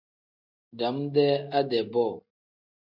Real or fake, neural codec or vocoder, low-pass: real; none; 5.4 kHz